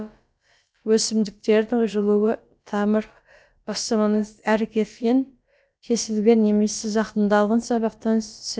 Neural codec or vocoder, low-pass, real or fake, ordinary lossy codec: codec, 16 kHz, about 1 kbps, DyCAST, with the encoder's durations; none; fake; none